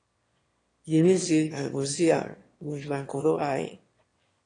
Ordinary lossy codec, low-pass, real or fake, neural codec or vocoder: AAC, 32 kbps; 9.9 kHz; fake; autoencoder, 22.05 kHz, a latent of 192 numbers a frame, VITS, trained on one speaker